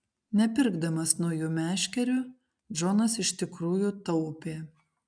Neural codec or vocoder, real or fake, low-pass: none; real; 9.9 kHz